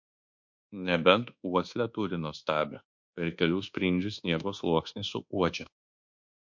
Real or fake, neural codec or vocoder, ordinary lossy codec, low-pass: fake; codec, 24 kHz, 1.2 kbps, DualCodec; MP3, 48 kbps; 7.2 kHz